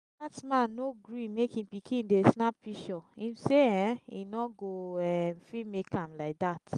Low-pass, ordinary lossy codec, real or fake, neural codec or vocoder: 10.8 kHz; Opus, 32 kbps; real; none